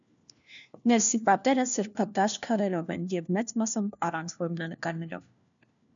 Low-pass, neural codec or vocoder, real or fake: 7.2 kHz; codec, 16 kHz, 1 kbps, FunCodec, trained on LibriTTS, 50 frames a second; fake